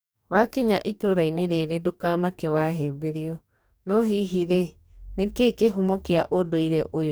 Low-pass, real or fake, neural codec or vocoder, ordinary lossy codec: none; fake; codec, 44.1 kHz, 2.6 kbps, DAC; none